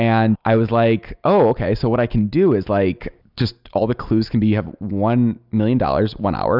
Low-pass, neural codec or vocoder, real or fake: 5.4 kHz; none; real